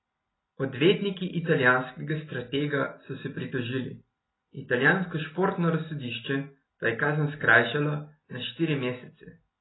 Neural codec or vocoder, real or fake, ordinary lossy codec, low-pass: none; real; AAC, 16 kbps; 7.2 kHz